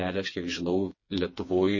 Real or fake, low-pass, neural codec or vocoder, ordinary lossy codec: fake; 7.2 kHz; codec, 16 kHz, 4 kbps, FreqCodec, smaller model; MP3, 32 kbps